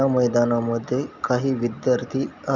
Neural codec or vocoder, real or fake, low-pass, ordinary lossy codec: none; real; 7.2 kHz; none